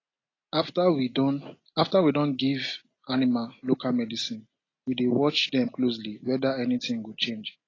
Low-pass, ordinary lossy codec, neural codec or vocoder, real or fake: 7.2 kHz; AAC, 32 kbps; none; real